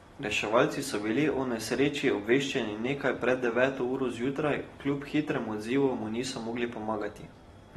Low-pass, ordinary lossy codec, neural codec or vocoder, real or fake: 19.8 kHz; AAC, 32 kbps; none; real